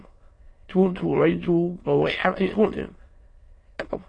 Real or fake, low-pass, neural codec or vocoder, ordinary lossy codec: fake; 9.9 kHz; autoencoder, 22.05 kHz, a latent of 192 numbers a frame, VITS, trained on many speakers; AAC, 32 kbps